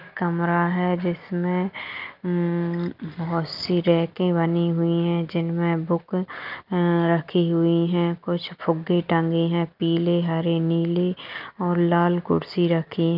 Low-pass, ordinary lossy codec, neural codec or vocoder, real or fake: 5.4 kHz; Opus, 24 kbps; none; real